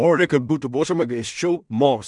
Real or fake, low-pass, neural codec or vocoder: fake; 10.8 kHz; codec, 16 kHz in and 24 kHz out, 0.4 kbps, LongCat-Audio-Codec, two codebook decoder